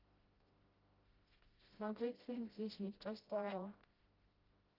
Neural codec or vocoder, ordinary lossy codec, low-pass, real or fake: codec, 16 kHz, 0.5 kbps, FreqCodec, smaller model; Opus, 32 kbps; 5.4 kHz; fake